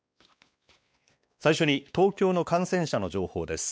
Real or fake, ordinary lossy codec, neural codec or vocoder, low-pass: fake; none; codec, 16 kHz, 2 kbps, X-Codec, WavLM features, trained on Multilingual LibriSpeech; none